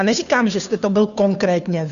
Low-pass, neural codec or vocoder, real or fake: 7.2 kHz; codec, 16 kHz, 2 kbps, FunCodec, trained on Chinese and English, 25 frames a second; fake